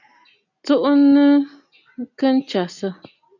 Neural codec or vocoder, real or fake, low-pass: none; real; 7.2 kHz